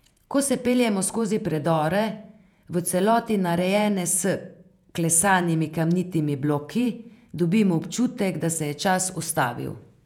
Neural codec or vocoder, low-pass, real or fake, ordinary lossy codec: vocoder, 48 kHz, 128 mel bands, Vocos; 19.8 kHz; fake; none